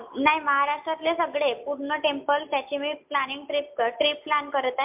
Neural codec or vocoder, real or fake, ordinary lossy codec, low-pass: none; real; MP3, 32 kbps; 3.6 kHz